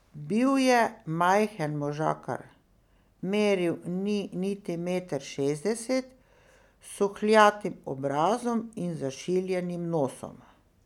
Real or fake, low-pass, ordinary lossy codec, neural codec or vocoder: real; 19.8 kHz; none; none